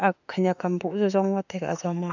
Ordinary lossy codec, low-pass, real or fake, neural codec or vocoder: none; 7.2 kHz; fake; autoencoder, 48 kHz, 32 numbers a frame, DAC-VAE, trained on Japanese speech